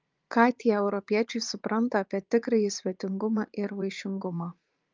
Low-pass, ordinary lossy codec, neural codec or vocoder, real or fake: 7.2 kHz; Opus, 24 kbps; vocoder, 44.1 kHz, 80 mel bands, Vocos; fake